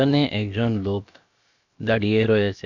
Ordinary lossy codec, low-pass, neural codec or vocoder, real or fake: none; 7.2 kHz; codec, 16 kHz, about 1 kbps, DyCAST, with the encoder's durations; fake